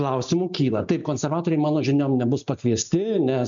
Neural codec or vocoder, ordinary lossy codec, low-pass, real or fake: none; MP3, 64 kbps; 7.2 kHz; real